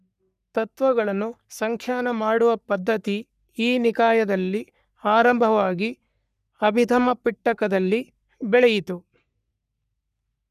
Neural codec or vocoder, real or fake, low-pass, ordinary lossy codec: codec, 44.1 kHz, 3.4 kbps, Pupu-Codec; fake; 14.4 kHz; none